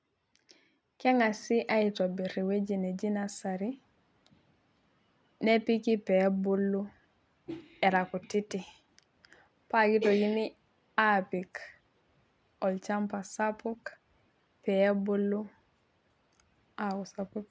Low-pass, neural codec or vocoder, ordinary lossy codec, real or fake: none; none; none; real